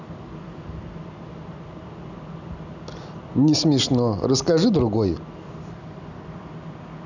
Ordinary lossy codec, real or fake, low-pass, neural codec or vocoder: none; real; 7.2 kHz; none